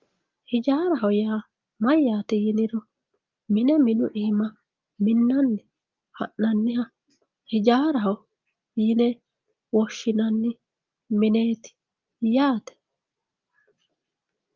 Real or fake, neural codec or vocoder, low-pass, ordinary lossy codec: real; none; 7.2 kHz; Opus, 32 kbps